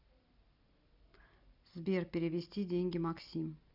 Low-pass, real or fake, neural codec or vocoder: 5.4 kHz; real; none